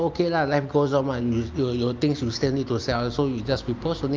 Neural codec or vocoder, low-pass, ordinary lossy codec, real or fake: none; 7.2 kHz; Opus, 24 kbps; real